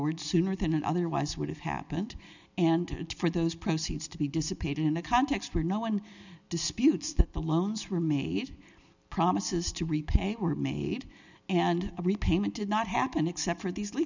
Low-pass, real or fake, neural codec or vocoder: 7.2 kHz; real; none